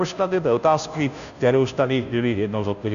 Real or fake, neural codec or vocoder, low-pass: fake; codec, 16 kHz, 0.5 kbps, FunCodec, trained on Chinese and English, 25 frames a second; 7.2 kHz